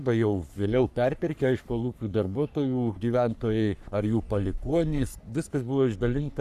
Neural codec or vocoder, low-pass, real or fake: codec, 44.1 kHz, 3.4 kbps, Pupu-Codec; 14.4 kHz; fake